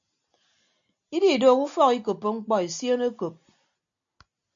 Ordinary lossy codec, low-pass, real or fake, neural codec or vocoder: MP3, 64 kbps; 7.2 kHz; real; none